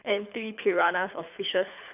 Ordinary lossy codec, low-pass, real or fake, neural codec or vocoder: none; 3.6 kHz; fake; codec, 24 kHz, 6 kbps, HILCodec